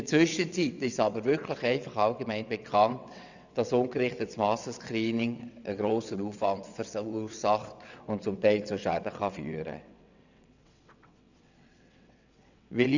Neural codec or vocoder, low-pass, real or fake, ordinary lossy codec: vocoder, 22.05 kHz, 80 mel bands, WaveNeXt; 7.2 kHz; fake; none